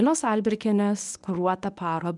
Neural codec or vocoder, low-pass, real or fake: codec, 24 kHz, 0.9 kbps, WavTokenizer, medium speech release version 1; 10.8 kHz; fake